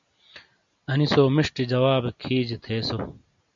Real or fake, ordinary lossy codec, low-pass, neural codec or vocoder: real; MP3, 64 kbps; 7.2 kHz; none